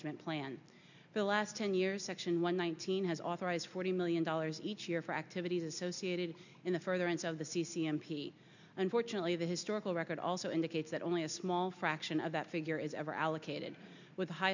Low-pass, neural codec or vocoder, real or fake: 7.2 kHz; none; real